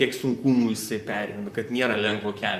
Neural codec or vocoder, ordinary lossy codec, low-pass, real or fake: vocoder, 44.1 kHz, 128 mel bands, Pupu-Vocoder; Opus, 64 kbps; 14.4 kHz; fake